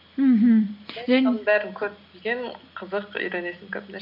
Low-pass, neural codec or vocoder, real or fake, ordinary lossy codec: 5.4 kHz; none; real; MP3, 48 kbps